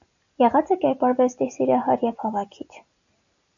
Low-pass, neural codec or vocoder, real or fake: 7.2 kHz; none; real